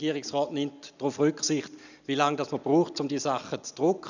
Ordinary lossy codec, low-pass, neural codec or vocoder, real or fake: none; 7.2 kHz; none; real